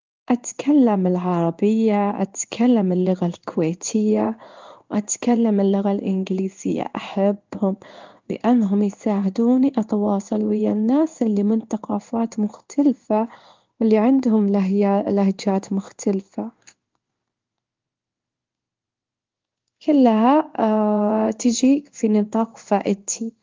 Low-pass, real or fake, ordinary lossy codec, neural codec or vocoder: 7.2 kHz; fake; Opus, 32 kbps; codec, 16 kHz in and 24 kHz out, 1 kbps, XY-Tokenizer